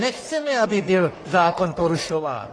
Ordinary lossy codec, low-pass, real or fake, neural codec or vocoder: AAC, 32 kbps; 9.9 kHz; fake; codec, 44.1 kHz, 1.7 kbps, Pupu-Codec